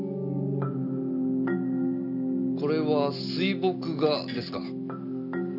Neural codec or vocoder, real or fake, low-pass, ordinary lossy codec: none; real; 5.4 kHz; none